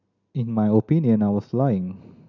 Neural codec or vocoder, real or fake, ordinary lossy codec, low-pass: none; real; none; 7.2 kHz